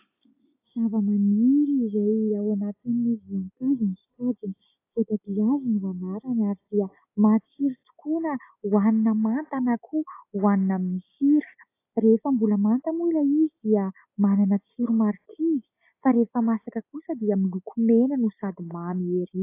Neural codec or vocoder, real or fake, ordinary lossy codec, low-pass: none; real; AAC, 24 kbps; 3.6 kHz